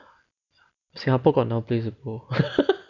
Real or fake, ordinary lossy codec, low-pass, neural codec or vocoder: real; none; 7.2 kHz; none